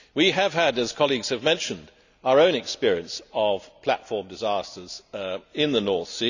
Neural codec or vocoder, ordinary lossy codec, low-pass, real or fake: none; none; 7.2 kHz; real